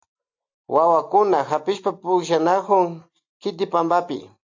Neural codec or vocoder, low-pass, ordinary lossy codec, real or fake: none; 7.2 kHz; AAC, 48 kbps; real